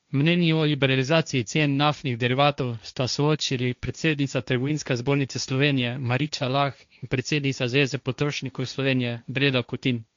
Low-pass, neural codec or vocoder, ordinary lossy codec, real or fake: 7.2 kHz; codec, 16 kHz, 1.1 kbps, Voila-Tokenizer; MP3, 64 kbps; fake